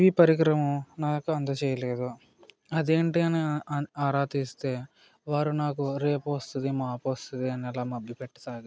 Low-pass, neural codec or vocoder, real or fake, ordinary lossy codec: none; none; real; none